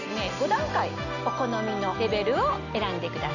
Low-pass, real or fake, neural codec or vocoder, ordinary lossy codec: 7.2 kHz; real; none; none